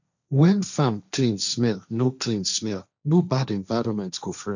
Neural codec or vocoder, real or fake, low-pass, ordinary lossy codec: codec, 16 kHz, 1.1 kbps, Voila-Tokenizer; fake; 7.2 kHz; none